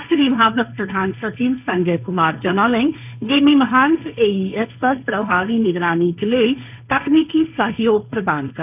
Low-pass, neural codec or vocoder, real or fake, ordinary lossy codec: 3.6 kHz; codec, 16 kHz, 1.1 kbps, Voila-Tokenizer; fake; none